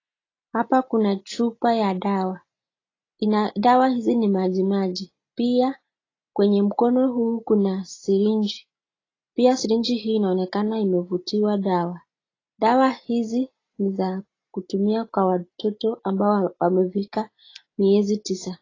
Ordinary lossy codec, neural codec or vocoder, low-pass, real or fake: AAC, 32 kbps; none; 7.2 kHz; real